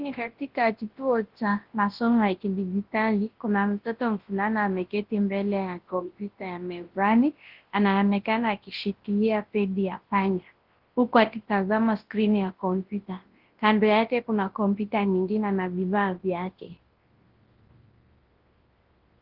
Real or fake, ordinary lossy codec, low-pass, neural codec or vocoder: fake; Opus, 16 kbps; 5.4 kHz; codec, 24 kHz, 0.9 kbps, WavTokenizer, large speech release